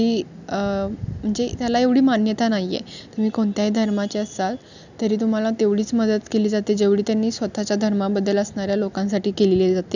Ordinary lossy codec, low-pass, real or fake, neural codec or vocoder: none; 7.2 kHz; real; none